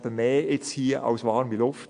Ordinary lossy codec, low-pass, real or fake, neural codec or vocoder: AAC, 64 kbps; 9.9 kHz; real; none